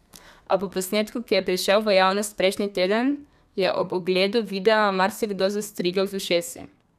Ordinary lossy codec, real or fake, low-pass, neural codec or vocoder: none; fake; 14.4 kHz; codec, 32 kHz, 1.9 kbps, SNAC